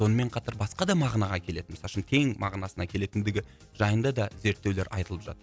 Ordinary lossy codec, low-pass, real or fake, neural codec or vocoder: none; none; real; none